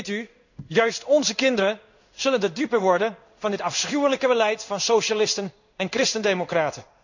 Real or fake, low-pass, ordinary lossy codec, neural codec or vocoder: fake; 7.2 kHz; none; codec, 16 kHz in and 24 kHz out, 1 kbps, XY-Tokenizer